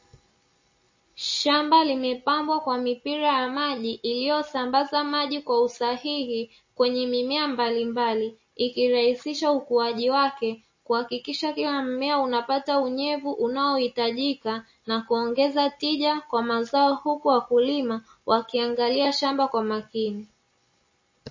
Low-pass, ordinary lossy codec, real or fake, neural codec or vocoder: 7.2 kHz; MP3, 32 kbps; real; none